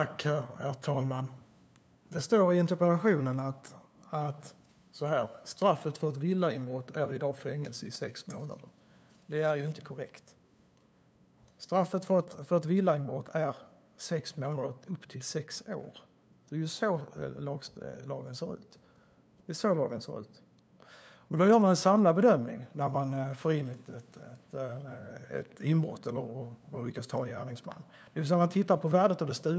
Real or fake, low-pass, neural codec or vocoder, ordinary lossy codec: fake; none; codec, 16 kHz, 2 kbps, FunCodec, trained on LibriTTS, 25 frames a second; none